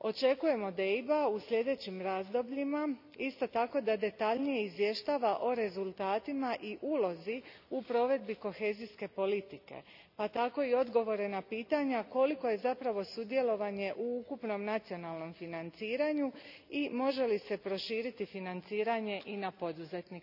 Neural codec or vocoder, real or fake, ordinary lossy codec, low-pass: none; real; none; 5.4 kHz